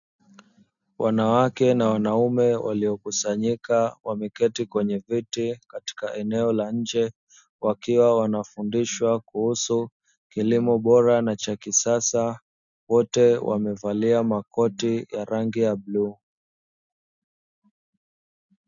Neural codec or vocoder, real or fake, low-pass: none; real; 7.2 kHz